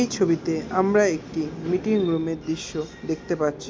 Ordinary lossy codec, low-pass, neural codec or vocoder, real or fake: none; none; none; real